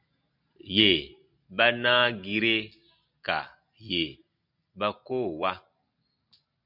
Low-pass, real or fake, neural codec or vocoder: 5.4 kHz; real; none